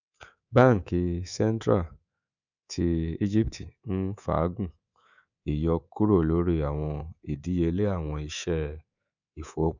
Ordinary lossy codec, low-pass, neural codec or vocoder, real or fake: none; 7.2 kHz; codec, 24 kHz, 3.1 kbps, DualCodec; fake